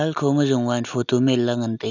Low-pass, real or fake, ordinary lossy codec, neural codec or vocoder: 7.2 kHz; real; none; none